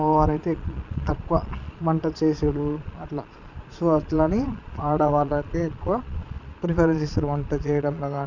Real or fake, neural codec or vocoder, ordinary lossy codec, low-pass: fake; vocoder, 22.05 kHz, 80 mel bands, Vocos; none; 7.2 kHz